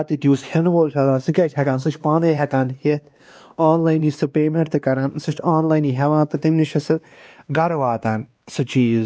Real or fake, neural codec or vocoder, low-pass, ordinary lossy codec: fake; codec, 16 kHz, 2 kbps, X-Codec, WavLM features, trained on Multilingual LibriSpeech; none; none